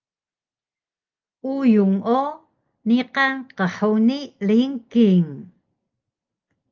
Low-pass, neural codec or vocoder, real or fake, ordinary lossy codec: 7.2 kHz; none; real; Opus, 24 kbps